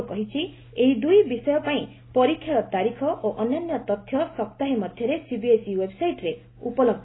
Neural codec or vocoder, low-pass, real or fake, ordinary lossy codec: none; 7.2 kHz; real; AAC, 16 kbps